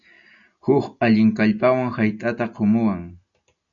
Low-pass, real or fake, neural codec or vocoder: 7.2 kHz; real; none